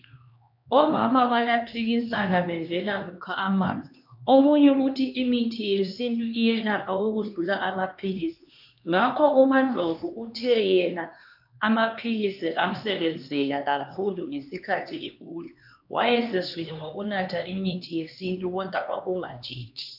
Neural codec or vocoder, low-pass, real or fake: codec, 16 kHz, 2 kbps, X-Codec, HuBERT features, trained on LibriSpeech; 5.4 kHz; fake